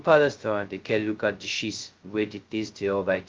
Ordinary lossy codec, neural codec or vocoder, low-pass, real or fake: Opus, 32 kbps; codec, 16 kHz, 0.2 kbps, FocalCodec; 7.2 kHz; fake